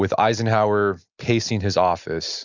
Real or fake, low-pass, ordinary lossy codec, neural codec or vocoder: real; 7.2 kHz; Opus, 64 kbps; none